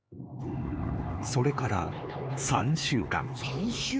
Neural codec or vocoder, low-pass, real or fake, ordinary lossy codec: codec, 16 kHz, 4 kbps, X-Codec, HuBERT features, trained on LibriSpeech; none; fake; none